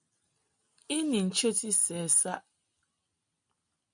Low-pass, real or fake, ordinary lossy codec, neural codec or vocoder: 9.9 kHz; real; MP3, 64 kbps; none